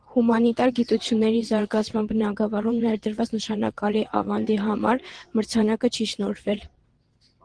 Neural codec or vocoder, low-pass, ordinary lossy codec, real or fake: vocoder, 22.05 kHz, 80 mel bands, WaveNeXt; 9.9 kHz; Opus, 16 kbps; fake